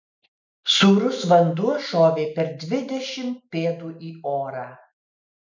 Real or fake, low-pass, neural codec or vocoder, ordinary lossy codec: real; 7.2 kHz; none; MP3, 64 kbps